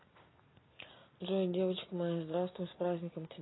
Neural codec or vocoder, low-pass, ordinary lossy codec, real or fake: none; 7.2 kHz; AAC, 16 kbps; real